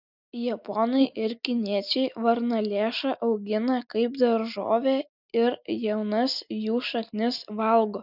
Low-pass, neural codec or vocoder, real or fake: 5.4 kHz; none; real